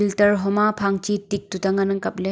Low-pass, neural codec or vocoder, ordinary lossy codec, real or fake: none; none; none; real